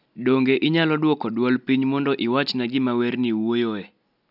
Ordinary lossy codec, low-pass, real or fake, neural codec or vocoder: none; 5.4 kHz; real; none